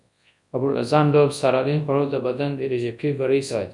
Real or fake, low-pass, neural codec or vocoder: fake; 10.8 kHz; codec, 24 kHz, 0.9 kbps, WavTokenizer, large speech release